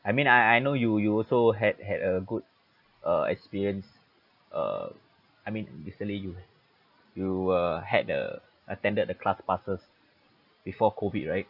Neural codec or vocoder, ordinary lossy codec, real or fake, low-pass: none; none; real; 5.4 kHz